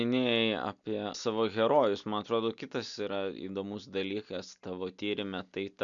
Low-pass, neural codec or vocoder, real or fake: 7.2 kHz; none; real